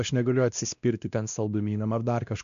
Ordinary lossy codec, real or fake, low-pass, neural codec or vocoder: MP3, 64 kbps; fake; 7.2 kHz; codec, 16 kHz, 1 kbps, X-Codec, WavLM features, trained on Multilingual LibriSpeech